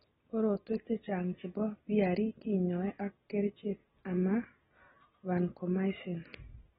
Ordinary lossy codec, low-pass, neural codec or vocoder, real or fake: AAC, 16 kbps; 19.8 kHz; none; real